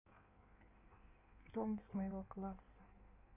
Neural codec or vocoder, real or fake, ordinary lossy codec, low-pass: codec, 16 kHz in and 24 kHz out, 1.1 kbps, FireRedTTS-2 codec; fake; none; 3.6 kHz